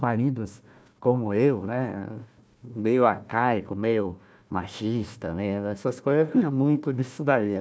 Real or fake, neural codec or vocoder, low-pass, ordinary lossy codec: fake; codec, 16 kHz, 1 kbps, FunCodec, trained on Chinese and English, 50 frames a second; none; none